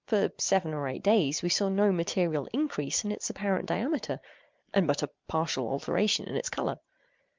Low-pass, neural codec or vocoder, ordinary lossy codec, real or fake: 7.2 kHz; none; Opus, 24 kbps; real